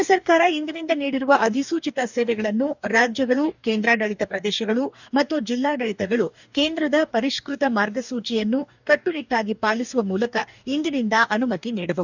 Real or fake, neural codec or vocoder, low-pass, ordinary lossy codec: fake; codec, 44.1 kHz, 2.6 kbps, DAC; 7.2 kHz; none